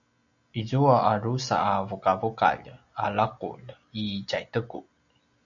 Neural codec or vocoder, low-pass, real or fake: none; 7.2 kHz; real